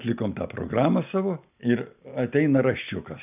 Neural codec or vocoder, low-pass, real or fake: none; 3.6 kHz; real